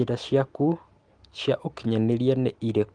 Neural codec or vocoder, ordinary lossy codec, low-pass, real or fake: none; Opus, 24 kbps; 9.9 kHz; real